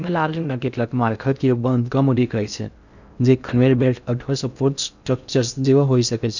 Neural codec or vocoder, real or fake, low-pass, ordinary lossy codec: codec, 16 kHz in and 24 kHz out, 0.6 kbps, FocalCodec, streaming, 2048 codes; fake; 7.2 kHz; none